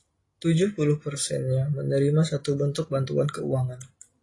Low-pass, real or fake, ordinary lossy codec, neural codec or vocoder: 10.8 kHz; real; AAC, 32 kbps; none